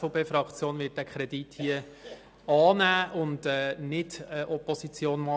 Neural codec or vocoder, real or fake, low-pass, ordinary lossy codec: none; real; none; none